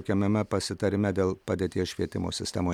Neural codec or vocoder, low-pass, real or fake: none; 19.8 kHz; real